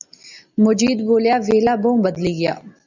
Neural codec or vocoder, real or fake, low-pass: none; real; 7.2 kHz